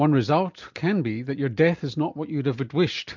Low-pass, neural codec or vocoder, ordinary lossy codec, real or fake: 7.2 kHz; none; MP3, 64 kbps; real